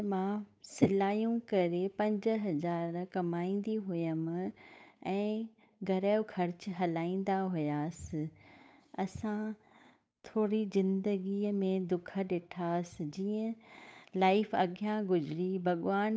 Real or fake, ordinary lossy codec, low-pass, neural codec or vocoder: fake; none; none; codec, 16 kHz, 8 kbps, FunCodec, trained on Chinese and English, 25 frames a second